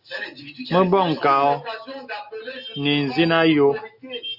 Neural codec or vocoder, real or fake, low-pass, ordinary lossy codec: none; real; 5.4 kHz; AAC, 48 kbps